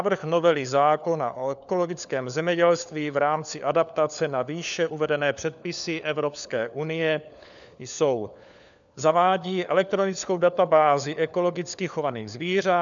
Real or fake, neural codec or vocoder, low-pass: fake; codec, 16 kHz, 4 kbps, FunCodec, trained on LibriTTS, 50 frames a second; 7.2 kHz